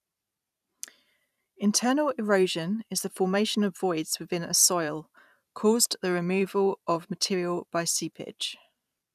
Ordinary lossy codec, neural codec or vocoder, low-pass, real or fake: none; vocoder, 44.1 kHz, 128 mel bands every 512 samples, BigVGAN v2; 14.4 kHz; fake